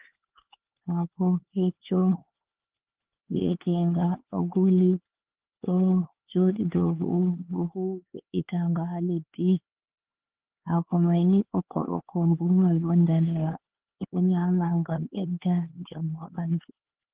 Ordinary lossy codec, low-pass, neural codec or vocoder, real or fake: Opus, 16 kbps; 3.6 kHz; codec, 16 kHz, 4 kbps, X-Codec, HuBERT features, trained on LibriSpeech; fake